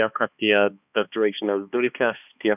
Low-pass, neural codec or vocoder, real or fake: 3.6 kHz; codec, 16 kHz, 2 kbps, X-Codec, HuBERT features, trained on balanced general audio; fake